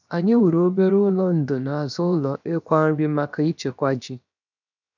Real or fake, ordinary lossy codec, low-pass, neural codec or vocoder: fake; none; 7.2 kHz; codec, 16 kHz, 0.7 kbps, FocalCodec